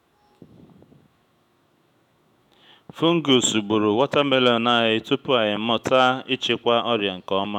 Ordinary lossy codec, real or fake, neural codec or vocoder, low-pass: none; fake; autoencoder, 48 kHz, 128 numbers a frame, DAC-VAE, trained on Japanese speech; 19.8 kHz